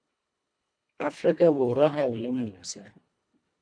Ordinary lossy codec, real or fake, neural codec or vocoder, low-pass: MP3, 64 kbps; fake; codec, 24 kHz, 1.5 kbps, HILCodec; 9.9 kHz